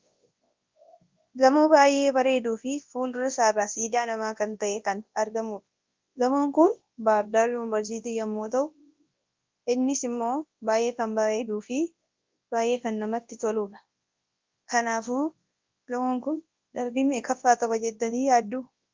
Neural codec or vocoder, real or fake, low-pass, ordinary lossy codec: codec, 24 kHz, 0.9 kbps, WavTokenizer, large speech release; fake; 7.2 kHz; Opus, 24 kbps